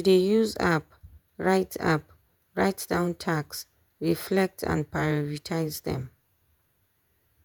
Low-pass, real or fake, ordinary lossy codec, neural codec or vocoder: none; fake; none; vocoder, 48 kHz, 128 mel bands, Vocos